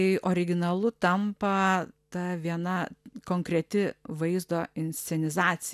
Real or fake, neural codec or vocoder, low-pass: real; none; 14.4 kHz